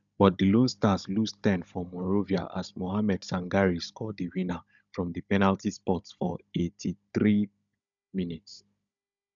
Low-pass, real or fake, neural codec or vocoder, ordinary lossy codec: 7.2 kHz; fake; codec, 16 kHz, 16 kbps, FunCodec, trained on Chinese and English, 50 frames a second; none